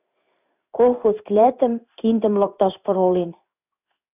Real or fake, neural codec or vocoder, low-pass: fake; codec, 16 kHz in and 24 kHz out, 1 kbps, XY-Tokenizer; 3.6 kHz